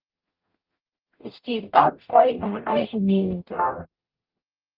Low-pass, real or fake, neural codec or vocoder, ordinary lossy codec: 5.4 kHz; fake; codec, 44.1 kHz, 0.9 kbps, DAC; Opus, 24 kbps